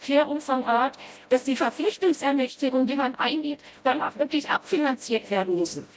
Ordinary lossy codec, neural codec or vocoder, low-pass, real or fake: none; codec, 16 kHz, 0.5 kbps, FreqCodec, smaller model; none; fake